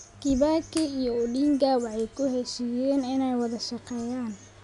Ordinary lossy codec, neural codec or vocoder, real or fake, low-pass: none; none; real; 10.8 kHz